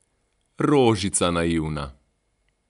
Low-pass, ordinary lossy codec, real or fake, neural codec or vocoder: 10.8 kHz; none; real; none